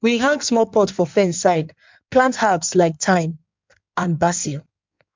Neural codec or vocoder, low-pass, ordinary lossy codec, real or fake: codec, 16 kHz in and 24 kHz out, 1.1 kbps, FireRedTTS-2 codec; 7.2 kHz; MP3, 64 kbps; fake